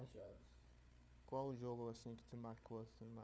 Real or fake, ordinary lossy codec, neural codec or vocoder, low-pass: fake; none; codec, 16 kHz, 4 kbps, FunCodec, trained on Chinese and English, 50 frames a second; none